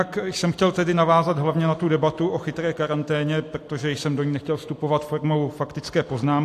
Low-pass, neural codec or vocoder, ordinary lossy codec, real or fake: 14.4 kHz; none; AAC, 64 kbps; real